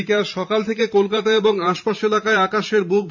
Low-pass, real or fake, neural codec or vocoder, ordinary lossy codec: 7.2 kHz; fake; vocoder, 44.1 kHz, 128 mel bands every 512 samples, BigVGAN v2; none